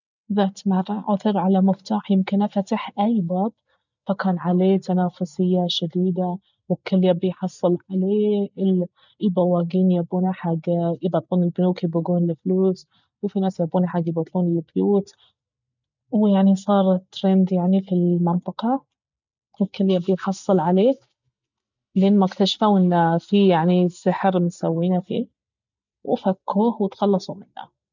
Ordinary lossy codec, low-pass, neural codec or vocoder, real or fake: none; 7.2 kHz; none; real